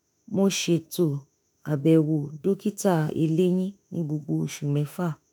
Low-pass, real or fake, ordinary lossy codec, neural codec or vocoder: none; fake; none; autoencoder, 48 kHz, 32 numbers a frame, DAC-VAE, trained on Japanese speech